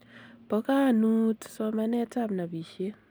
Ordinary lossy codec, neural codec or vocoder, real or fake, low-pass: none; none; real; none